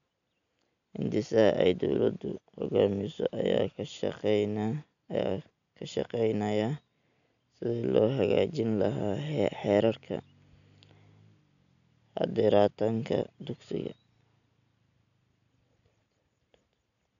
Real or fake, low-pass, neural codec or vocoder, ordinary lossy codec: real; 7.2 kHz; none; none